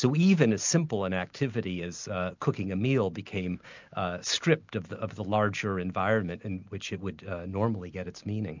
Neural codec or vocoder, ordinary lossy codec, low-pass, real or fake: none; MP3, 64 kbps; 7.2 kHz; real